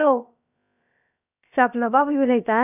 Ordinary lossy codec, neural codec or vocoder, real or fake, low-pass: none; codec, 16 kHz, about 1 kbps, DyCAST, with the encoder's durations; fake; 3.6 kHz